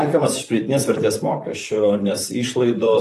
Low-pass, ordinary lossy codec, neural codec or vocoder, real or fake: 14.4 kHz; AAC, 48 kbps; vocoder, 44.1 kHz, 128 mel bands, Pupu-Vocoder; fake